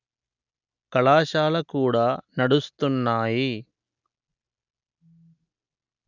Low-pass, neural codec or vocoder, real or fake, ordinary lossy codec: 7.2 kHz; none; real; none